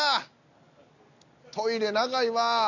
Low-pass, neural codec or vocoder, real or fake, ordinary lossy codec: 7.2 kHz; none; real; none